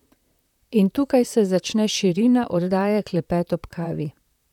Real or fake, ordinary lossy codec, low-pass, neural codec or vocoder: fake; none; 19.8 kHz; vocoder, 44.1 kHz, 128 mel bands, Pupu-Vocoder